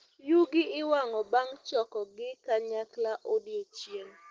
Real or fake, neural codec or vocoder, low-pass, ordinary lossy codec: real; none; 7.2 kHz; Opus, 16 kbps